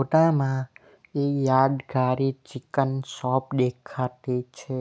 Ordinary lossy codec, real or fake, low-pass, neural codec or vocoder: none; real; none; none